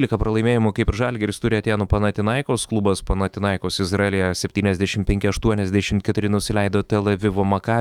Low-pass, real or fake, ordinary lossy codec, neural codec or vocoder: 19.8 kHz; fake; Opus, 64 kbps; autoencoder, 48 kHz, 128 numbers a frame, DAC-VAE, trained on Japanese speech